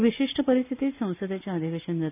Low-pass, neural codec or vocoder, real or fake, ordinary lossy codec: 3.6 kHz; none; real; none